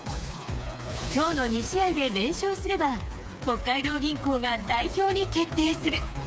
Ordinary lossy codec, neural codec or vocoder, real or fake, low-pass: none; codec, 16 kHz, 4 kbps, FreqCodec, smaller model; fake; none